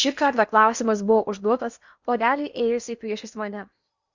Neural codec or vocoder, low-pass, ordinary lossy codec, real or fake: codec, 16 kHz in and 24 kHz out, 0.8 kbps, FocalCodec, streaming, 65536 codes; 7.2 kHz; Opus, 64 kbps; fake